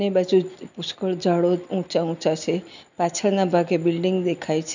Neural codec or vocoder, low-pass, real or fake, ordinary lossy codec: none; 7.2 kHz; real; none